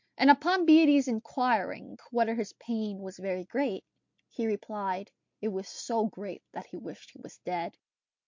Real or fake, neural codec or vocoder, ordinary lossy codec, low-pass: real; none; MP3, 48 kbps; 7.2 kHz